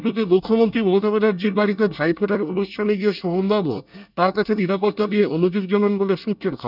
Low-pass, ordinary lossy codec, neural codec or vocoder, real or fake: 5.4 kHz; none; codec, 24 kHz, 1 kbps, SNAC; fake